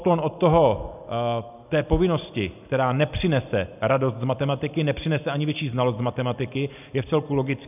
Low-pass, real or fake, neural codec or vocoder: 3.6 kHz; real; none